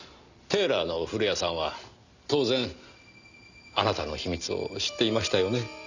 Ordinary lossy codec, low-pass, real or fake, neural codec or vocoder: none; 7.2 kHz; real; none